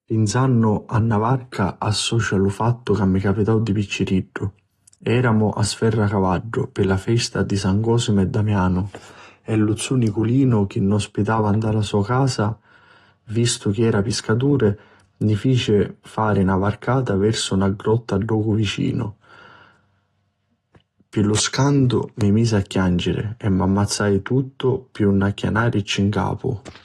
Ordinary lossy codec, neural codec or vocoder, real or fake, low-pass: AAC, 32 kbps; none; real; 19.8 kHz